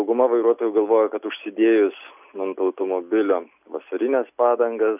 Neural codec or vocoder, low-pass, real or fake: none; 3.6 kHz; real